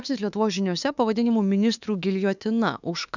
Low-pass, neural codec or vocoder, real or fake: 7.2 kHz; autoencoder, 48 kHz, 32 numbers a frame, DAC-VAE, trained on Japanese speech; fake